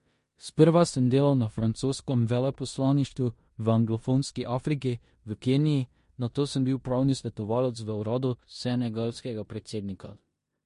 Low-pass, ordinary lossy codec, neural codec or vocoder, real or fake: 10.8 kHz; MP3, 48 kbps; codec, 16 kHz in and 24 kHz out, 0.9 kbps, LongCat-Audio-Codec, four codebook decoder; fake